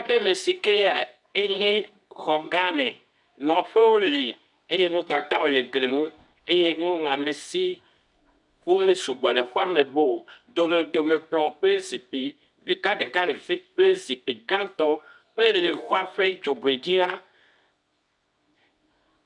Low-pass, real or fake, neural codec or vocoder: 10.8 kHz; fake; codec, 24 kHz, 0.9 kbps, WavTokenizer, medium music audio release